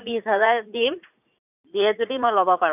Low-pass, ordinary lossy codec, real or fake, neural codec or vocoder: 3.6 kHz; none; fake; vocoder, 44.1 kHz, 80 mel bands, Vocos